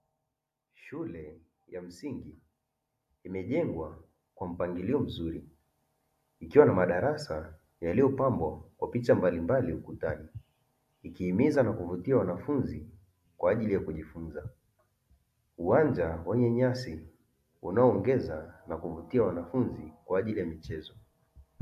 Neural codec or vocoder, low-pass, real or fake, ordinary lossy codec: none; 14.4 kHz; real; AAC, 96 kbps